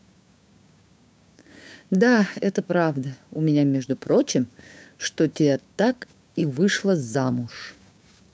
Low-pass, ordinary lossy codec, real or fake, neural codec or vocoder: none; none; fake; codec, 16 kHz, 6 kbps, DAC